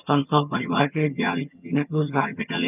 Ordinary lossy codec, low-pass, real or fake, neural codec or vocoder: none; 3.6 kHz; fake; vocoder, 22.05 kHz, 80 mel bands, HiFi-GAN